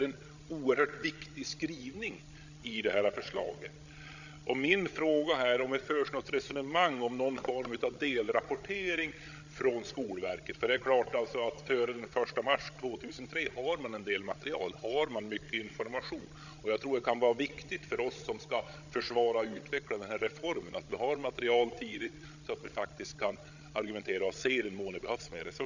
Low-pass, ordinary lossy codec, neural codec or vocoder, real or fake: 7.2 kHz; none; codec, 16 kHz, 16 kbps, FreqCodec, larger model; fake